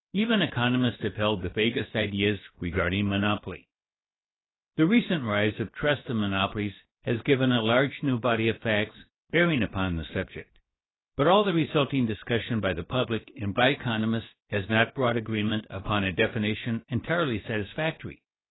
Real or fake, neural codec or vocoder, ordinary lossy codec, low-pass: fake; codec, 16 kHz, about 1 kbps, DyCAST, with the encoder's durations; AAC, 16 kbps; 7.2 kHz